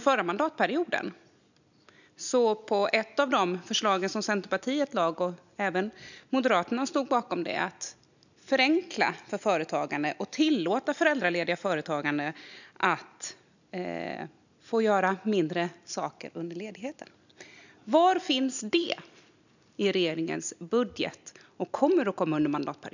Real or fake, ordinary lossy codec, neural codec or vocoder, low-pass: real; none; none; 7.2 kHz